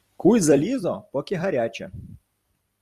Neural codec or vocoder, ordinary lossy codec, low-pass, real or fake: vocoder, 44.1 kHz, 128 mel bands every 256 samples, BigVGAN v2; Opus, 64 kbps; 14.4 kHz; fake